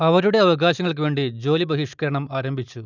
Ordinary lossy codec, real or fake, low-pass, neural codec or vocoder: none; real; 7.2 kHz; none